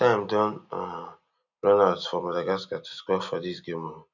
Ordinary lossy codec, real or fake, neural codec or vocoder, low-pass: none; real; none; 7.2 kHz